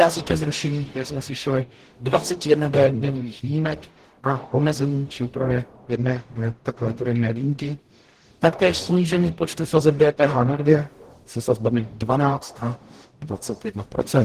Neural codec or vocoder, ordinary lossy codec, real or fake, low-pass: codec, 44.1 kHz, 0.9 kbps, DAC; Opus, 16 kbps; fake; 14.4 kHz